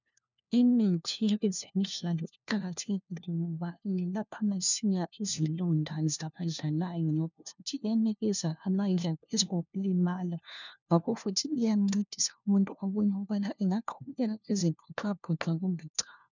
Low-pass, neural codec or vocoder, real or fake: 7.2 kHz; codec, 16 kHz, 1 kbps, FunCodec, trained on LibriTTS, 50 frames a second; fake